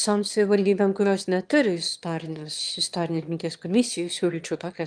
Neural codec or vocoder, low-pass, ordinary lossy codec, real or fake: autoencoder, 22.05 kHz, a latent of 192 numbers a frame, VITS, trained on one speaker; 9.9 kHz; Opus, 32 kbps; fake